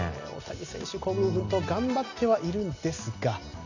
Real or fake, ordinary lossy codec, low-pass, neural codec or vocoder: real; none; 7.2 kHz; none